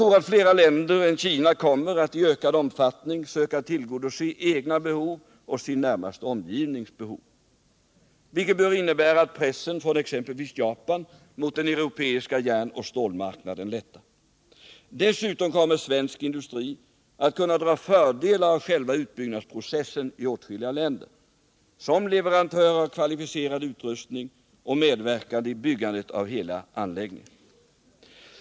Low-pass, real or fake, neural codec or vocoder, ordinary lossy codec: none; real; none; none